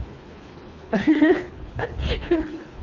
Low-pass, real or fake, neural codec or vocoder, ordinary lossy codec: 7.2 kHz; fake; codec, 24 kHz, 3 kbps, HILCodec; none